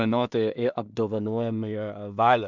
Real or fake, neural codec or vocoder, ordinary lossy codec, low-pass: fake; codec, 16 kHz in and 24 kHz out, 0.4 kbps, LongCat-Audio-Codec, two codebook decoder; MP3, 64 kbps; 7.2 kHz